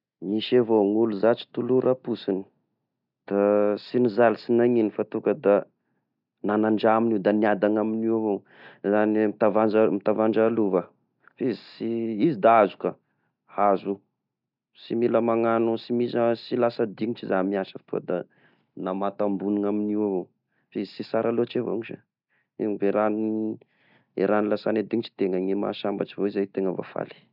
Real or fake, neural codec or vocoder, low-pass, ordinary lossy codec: real; none; 5.4 kHz; none